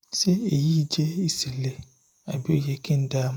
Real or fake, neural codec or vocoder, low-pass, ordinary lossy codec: fake; vocoder, 48 kHz, 128 mel bands, Vocos; none; none